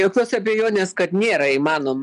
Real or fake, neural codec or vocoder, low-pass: real; none; 10.8 kHz